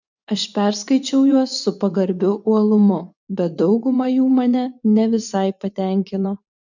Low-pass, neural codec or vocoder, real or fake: 7.2 kHz; vocoder, 24 kHz, 100 mel bands, Vocos; fake